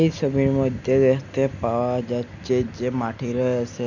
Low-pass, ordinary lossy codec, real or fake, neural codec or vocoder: 7.2 kHz; none; real; none